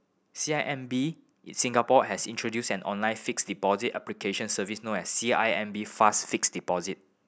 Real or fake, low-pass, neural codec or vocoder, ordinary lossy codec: real; none; none; none